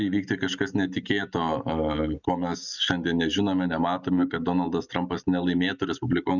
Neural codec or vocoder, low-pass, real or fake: none; 7.2 kHz; real